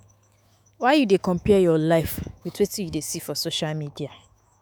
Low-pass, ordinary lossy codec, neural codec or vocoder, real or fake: none; none; autoencoder, 48 kHz, 128 numbers a frame, DAC-VAE, trained on Japanese speech; fake